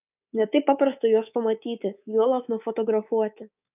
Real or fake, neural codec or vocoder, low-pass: fake; codec, 24 kHz, 3.1 kbps, DualCodec; 3.6 kHz